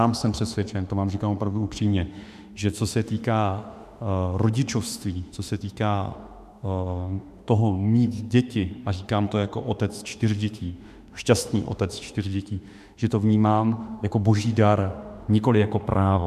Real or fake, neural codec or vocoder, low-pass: fake; autoencoder, 48 kHz, 32 numbers a frame, DAC-VAE, trained on Japanese speech; 14.4 kHz